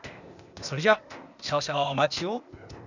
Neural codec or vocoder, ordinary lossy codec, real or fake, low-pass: codec, 16 kHz, 0.8 kbps, ZipCodec; none; fake; 7.2 kHz